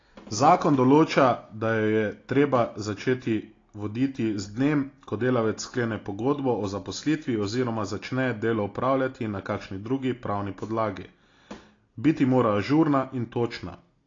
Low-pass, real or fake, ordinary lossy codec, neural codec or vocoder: 7.2 kHz; real; AAC, 32 kbps; none